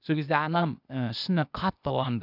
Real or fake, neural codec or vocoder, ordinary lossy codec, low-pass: fake; codec, 16 kHz, 0.8 kbps, ZipCodec; none; 5.4 kHz